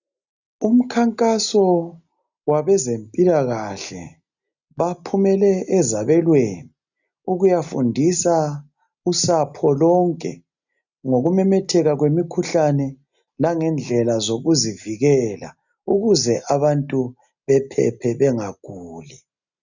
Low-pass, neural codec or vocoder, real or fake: 7.2 kHz; none; real